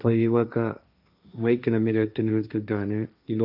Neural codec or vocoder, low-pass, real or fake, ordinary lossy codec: codec, 16 kHz, 1.1 kbps, Voila-Tokenizer; 5.4 kHz; fake; none